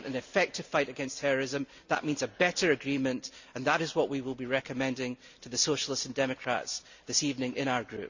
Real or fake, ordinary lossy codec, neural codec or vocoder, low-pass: real; Opus, 64 kbps; none; 7.2 kHz